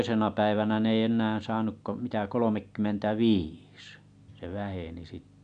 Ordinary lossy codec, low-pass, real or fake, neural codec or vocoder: none; 9.9 kHz; real; none